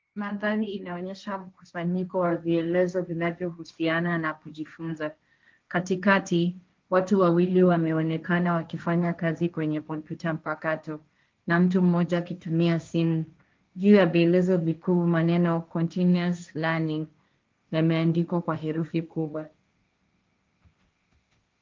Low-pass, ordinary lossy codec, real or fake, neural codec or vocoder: 7.2 kHz; Opus, 24 kbps; fake; codec, 16 kHz, 1.1 kbps, Voila-Tokenizer